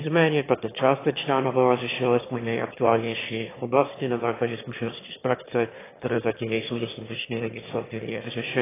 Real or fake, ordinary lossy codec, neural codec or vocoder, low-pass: fake; AAC, 16 kbps; autoencoder, 22.05 kHz, a latent of 192 numbers a frame, VITS, trained on one speaker; 3.6 kHz